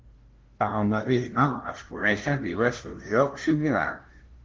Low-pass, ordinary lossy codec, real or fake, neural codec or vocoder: 7.2 kHz; Opus, 16 kbps; fake; codec, 16 kHz, 0.5 kbps, FunCodec, trained on LibriTTS, 25 frames a second